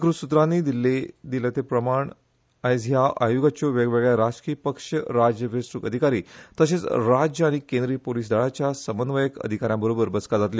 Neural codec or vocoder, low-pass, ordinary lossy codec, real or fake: none; none; none; real